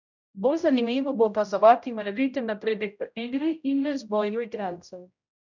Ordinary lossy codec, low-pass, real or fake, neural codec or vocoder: none; 7.2 kHz; fake; codec, 16 kHz, 0.5 kbps, X-Codec, HuBERT features, trained on general audio